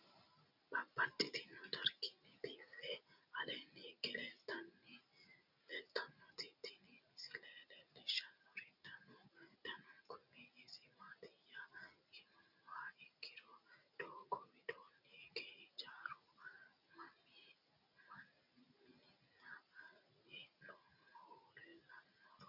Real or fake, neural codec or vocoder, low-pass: real; none; 5.4 kHz